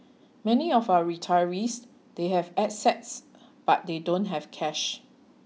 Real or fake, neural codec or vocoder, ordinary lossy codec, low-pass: real; none; none; none